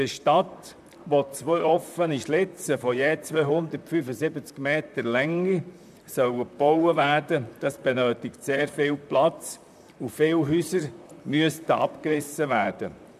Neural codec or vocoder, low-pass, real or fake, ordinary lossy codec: vocoder, 44.1 kHz, 128 mel bands, Pupu-Vocoder; 14.4 kHz; fake; none